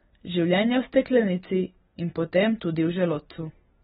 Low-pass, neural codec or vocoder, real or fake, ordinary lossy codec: 19.8 kHz; none; real; AAC, 16 kbps